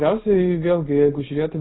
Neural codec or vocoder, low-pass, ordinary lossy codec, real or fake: none; 7.2 kHz; AAC, 16 kbps; real